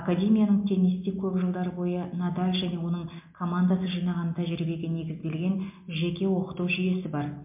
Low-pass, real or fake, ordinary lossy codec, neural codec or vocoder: 3.6 kHz; real; MP3, 32 kbps; none